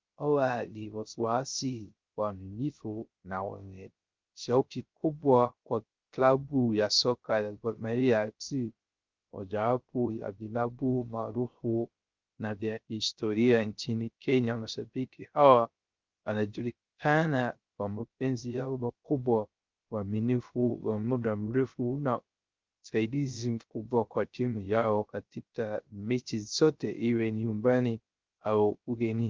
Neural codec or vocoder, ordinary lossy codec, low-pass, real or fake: codec, 16 kHz, 0.3 kbps, FocalCodec; Opus, 24 kbps; 7.2 kHz; fake